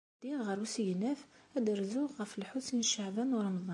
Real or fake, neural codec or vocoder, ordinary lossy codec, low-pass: real; none; MP3, 64 kbps; 10.8 kHz